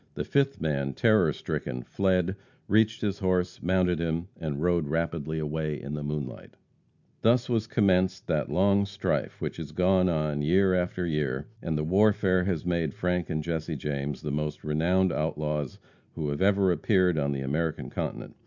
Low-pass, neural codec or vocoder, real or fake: 7.2 kHz; none; real